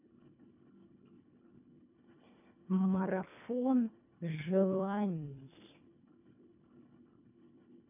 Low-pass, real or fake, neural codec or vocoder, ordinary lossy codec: 3.6 kHz; fake; codec, 24 kHz, 1.5 kbps, HILCodec; none